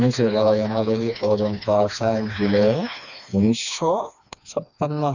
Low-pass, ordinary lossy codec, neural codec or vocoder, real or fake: 7.2 kHz; none; codec, 16 kHz, 2 kbps, FreqCodec, smaller model; fake